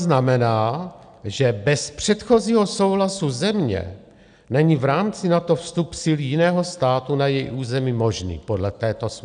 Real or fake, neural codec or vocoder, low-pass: real; none; 9.9 kHz